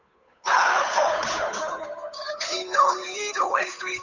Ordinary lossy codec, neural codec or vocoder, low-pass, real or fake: none; codec, 16 kHz, 8 kbps, FunCodec, trained on Chinese and English, 25 frames a second; 7.2 kHz; fake